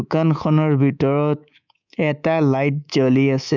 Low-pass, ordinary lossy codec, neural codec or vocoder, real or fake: 7.2 kHz; none; codec, 24 kHz, 3.1 kbps, DualCodec; fake